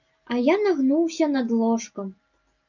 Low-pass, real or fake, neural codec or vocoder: 7.2 kHz; real; none